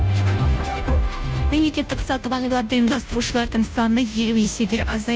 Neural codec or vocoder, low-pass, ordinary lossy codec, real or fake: codec, 16 kHz, 0.5 kbps, FunCodec, trained on Chinese and English, 25 frames a second; none; none; fake